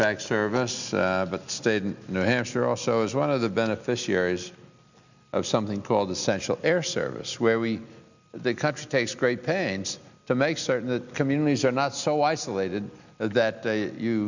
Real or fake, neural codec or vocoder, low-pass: real; none; 7.2 kHz